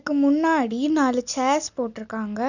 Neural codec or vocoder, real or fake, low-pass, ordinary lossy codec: none; real; 7.2 kHz; none